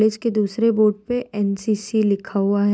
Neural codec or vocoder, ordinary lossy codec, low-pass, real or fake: none; none; none; real